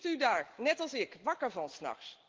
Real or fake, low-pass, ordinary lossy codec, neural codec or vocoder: real; 7.2 kHz; Opus, 16 kbps; none